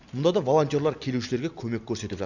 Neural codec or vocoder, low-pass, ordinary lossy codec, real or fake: none; 7.2 kHz; none; real